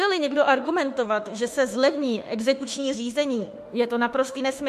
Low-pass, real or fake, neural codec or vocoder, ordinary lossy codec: 14.4 kHz; fake; autoencoder, 48 kHz, 32 numbers a frame, DAC-VAE, trained on Japanese speech; MP3, 64 kbps